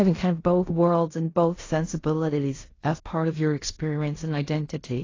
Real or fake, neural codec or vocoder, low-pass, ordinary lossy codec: fake; codec, 16 kHz in and 24 kHz out, 0.4 kbps, LongCat-Audio-Codec, fine tuned four codebook decoder; 7.2 kHz; AAC, 32 kbps